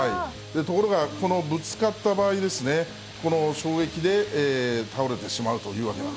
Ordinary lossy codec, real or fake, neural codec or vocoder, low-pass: none; real; none; none